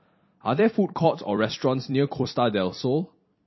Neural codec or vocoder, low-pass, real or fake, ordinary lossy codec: vocoder, 44.1 kHz, 128 mel bands every 256 samples, BigVGAN v2; 7.2 kHz; fake; MP3, 24 kbps